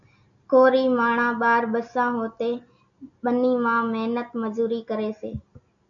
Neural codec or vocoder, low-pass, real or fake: none; 7.2 kHz; real